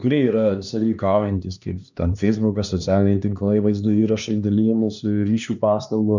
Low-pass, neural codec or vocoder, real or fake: 7.2 kHz; codec, 16 kHz, 2 kbps, X-Codec, HuBERT features, trained on LibriSpeech; fake